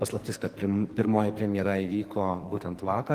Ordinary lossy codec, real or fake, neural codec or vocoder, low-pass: Opus, 24 kbps; fake; codec, 44.1 kHz, 2.6 kbps, SNAC; 14.4 kHz